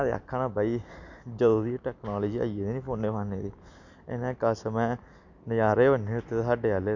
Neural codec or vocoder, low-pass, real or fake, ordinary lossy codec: none; 7.2 kHz; real; none